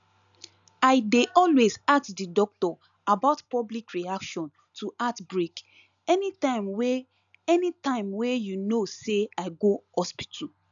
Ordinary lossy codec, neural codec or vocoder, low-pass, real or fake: none; none; 7.2 kHz; real